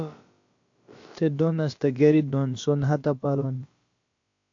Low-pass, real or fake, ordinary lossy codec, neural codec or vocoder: 7.2 kHz; fake; AAC, 48 kbps; codec, 16 kHz, about 1 kbps, DyCAST, with the encoder's durations